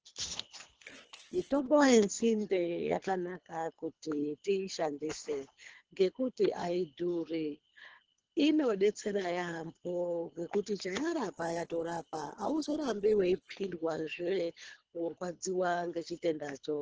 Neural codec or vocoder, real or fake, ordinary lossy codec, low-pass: codec, 24 kHz, 3 kbps, HILCodec; fake; Opus, 16 kbps; 7.2 kHz